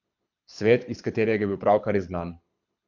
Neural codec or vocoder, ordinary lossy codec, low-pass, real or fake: codec, 24 kHz, 6 kbps, HILCodec; none; 7.2 kHz; fake